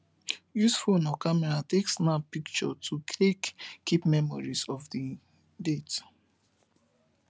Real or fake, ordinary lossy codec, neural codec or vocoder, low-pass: real; none; none; none